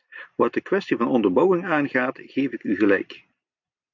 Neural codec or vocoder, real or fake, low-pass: none; real; 7.2 kHz